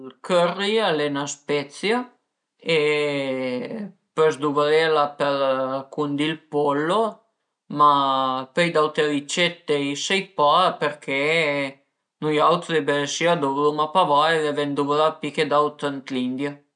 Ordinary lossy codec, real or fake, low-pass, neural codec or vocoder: none; real; 9.9 kHz; none